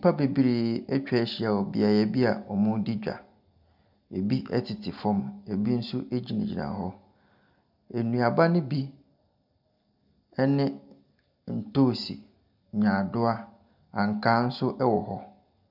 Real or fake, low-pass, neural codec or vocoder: real; 5.4 kHz; none